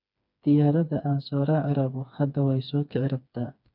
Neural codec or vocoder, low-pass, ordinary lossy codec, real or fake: codec, 16 kHz, 4 kbps, FreqCodec, smaller model; 5.4 kHz; none; fake